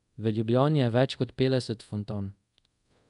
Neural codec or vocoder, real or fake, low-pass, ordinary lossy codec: codec, 24 kHz, 0.5 kbps, DualCodec; fake; 10.8 kHz; none